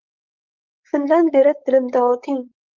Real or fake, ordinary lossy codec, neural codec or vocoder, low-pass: fake; Opus, 24 kbps; codec, 16 kHz, 4.8 kbps, FACodec; 7.2 kHz